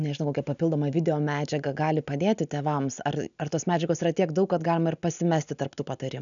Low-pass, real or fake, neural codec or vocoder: 7.2 kHz; real; none